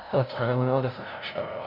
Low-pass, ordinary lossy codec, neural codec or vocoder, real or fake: 5.4 kHz; none; codec, 16 kHz, 0.5 kbps, FunCodec, trained on LibriTTS, 25 frames a second; fake